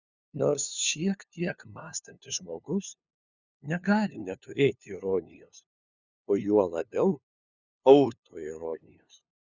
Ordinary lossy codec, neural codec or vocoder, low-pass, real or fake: Opus, 64 kbps; codec, 16 kHz, 2 kbps, FunCodec, trained on LibriTTS, 25 frames a second; 7.2 kHz; fake